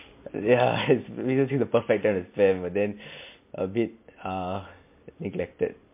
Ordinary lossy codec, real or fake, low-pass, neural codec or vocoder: MP3, 24 kbps; real; 3.6 kHz; none